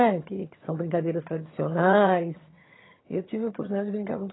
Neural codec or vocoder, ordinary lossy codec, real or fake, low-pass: vocoder, 22.05 kHz, 80 mel bands, HiFi-GAN; AAC, 16 kbps; fake; 7.2 kHz